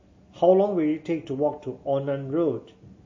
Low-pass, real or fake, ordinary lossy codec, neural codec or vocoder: 7.2 kHz; real; MP3, 32 kbps; none